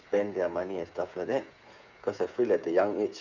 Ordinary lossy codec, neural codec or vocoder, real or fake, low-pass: none; codec, 16 kHz, 8 kbps, FreqCodec, smaller model; fake; 7.2 kHz